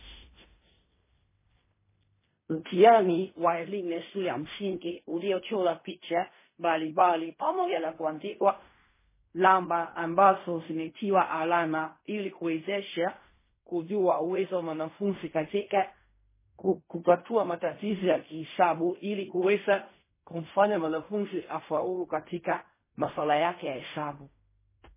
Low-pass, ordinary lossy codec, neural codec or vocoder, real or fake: 3.6 kHz; MP3, 16 kbps; codec, 16 kHz in and 24 kHz out, 0.4 kbps, LongCat-Audio-Codec, fine tuned four codebook decoder; fake